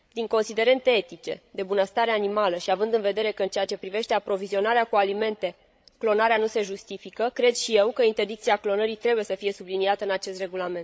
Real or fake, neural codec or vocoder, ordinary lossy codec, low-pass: fake; codec, 16 kHz, 16 kbps, FreqCodec, larger model; none; none